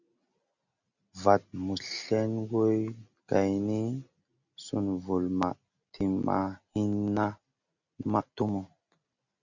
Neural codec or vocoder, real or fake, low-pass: none; real; 7.2 kHz